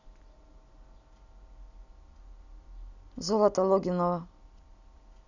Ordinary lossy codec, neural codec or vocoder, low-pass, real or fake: none; none; 7.2 kHz; real